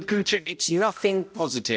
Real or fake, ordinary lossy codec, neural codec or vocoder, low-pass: fake; none; codec, 16 kHz, 0.5 kbps, X-Codec, HuBERT features, trained on balanced general audio; none